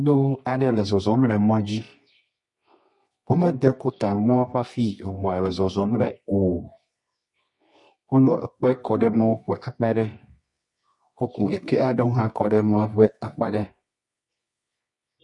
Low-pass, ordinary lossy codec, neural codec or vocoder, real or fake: 10.8 kHz; MP3, 48 kbps; codec, 24 kHz, 0.9 kbps, WavTokenizer, medium music audio release; fake